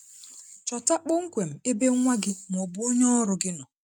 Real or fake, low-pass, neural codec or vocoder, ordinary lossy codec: real; 19.8 kHz; none; none